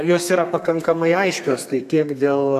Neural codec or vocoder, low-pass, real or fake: codec, 32 kHz, 1.9 kbps, SNAC; 14.4 kHz; fake